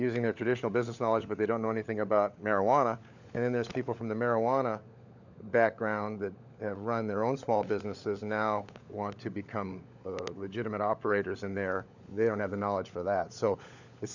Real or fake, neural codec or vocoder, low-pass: fake; codec, 16 kHz, 16 kbps, FunCodec, trained on LibriTTS, 50 frames a second; 7.2 kHz